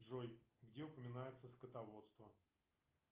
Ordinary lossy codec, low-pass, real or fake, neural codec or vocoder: Opus, 24 kbps; 3.6 kHz; real; none